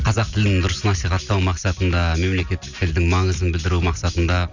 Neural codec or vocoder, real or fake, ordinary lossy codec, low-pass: none; real; none; 7.2 kHz